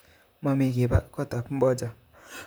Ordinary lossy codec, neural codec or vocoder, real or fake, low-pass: none; vocoder, 44.1 kHz, 128 mel bands, Pupu-Vocoder; fake; none